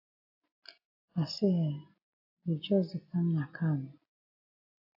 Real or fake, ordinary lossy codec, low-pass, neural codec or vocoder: real; AAC, 32 kbps; 5.4 kHz; none